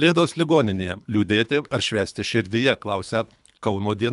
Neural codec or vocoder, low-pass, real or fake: codec, 24 kHz, 3 kbps, HILCodec; 10.8 kHz; fake